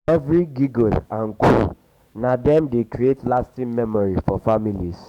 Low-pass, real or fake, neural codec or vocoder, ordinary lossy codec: 19.8 kHz; fake; codec, 44.1 kHz, 7.8 kbps, DAC; none